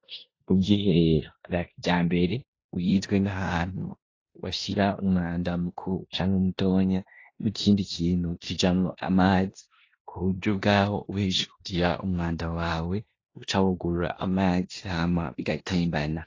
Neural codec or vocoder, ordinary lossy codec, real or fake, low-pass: codec, 16 kHz in and 24 kHz out, 0.9 kbps, LongCat-Audio-Codec, four codebook decoder; AAC, 32 kbps; fake; 7.2 kHz